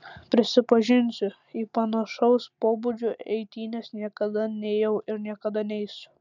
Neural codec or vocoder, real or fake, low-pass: none; real; 7.2 kHz